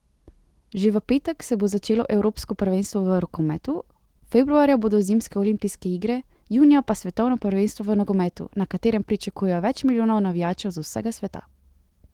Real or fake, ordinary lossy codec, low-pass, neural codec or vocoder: fake; Opus, 16 kbps; 19.8 kHz; autoencoder, 48 kHz, 128 numbers a frame, DAC-VAE, trained on Japanese speech